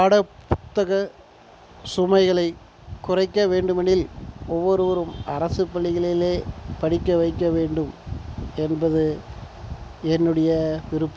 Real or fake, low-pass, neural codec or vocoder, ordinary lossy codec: real; none; none; none